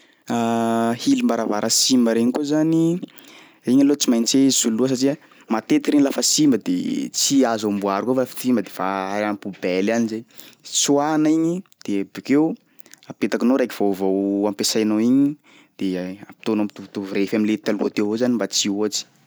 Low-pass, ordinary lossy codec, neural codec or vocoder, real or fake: none; none; none; real